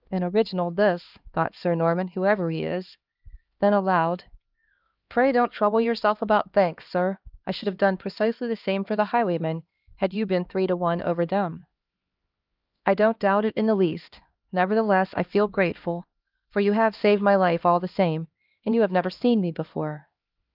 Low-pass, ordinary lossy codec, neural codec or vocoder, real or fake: 5.4 kHz; Opus, 32 kbps; codec, 16 kHz, 2 kbps, X-Codec, HuBERT features, trained on LibriSpeech; fake